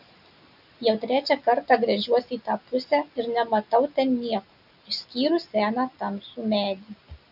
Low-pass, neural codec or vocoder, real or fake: 5.4 kHz; none; real